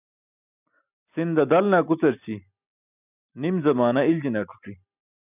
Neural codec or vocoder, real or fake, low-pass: none; real; 3.6 kHz